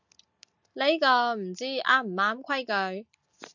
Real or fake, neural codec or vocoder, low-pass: real; none; 7.2 kHz